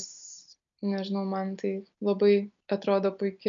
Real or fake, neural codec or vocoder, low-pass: real; none; 7.2 kHz